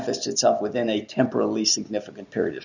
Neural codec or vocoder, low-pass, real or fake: none; 7.2 kHz; real